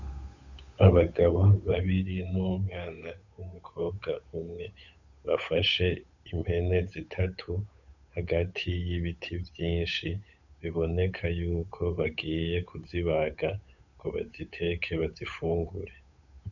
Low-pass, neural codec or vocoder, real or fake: 7.2 kHz; codec, 16 kHz, 8 kbps, FunCodec, trained on Chinese and English, 25 frames a second; fake